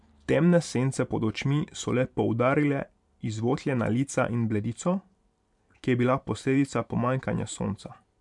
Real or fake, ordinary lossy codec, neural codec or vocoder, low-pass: fake; MP3, 96 kbps; vocoder, 44.1 kHz, 128 mel bands every 512 samples, BigVGAN v2; 10.8 kHz